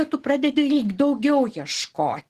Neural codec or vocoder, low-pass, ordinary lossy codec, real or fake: none; 14.4 kHz; Opus, 16 kbps; real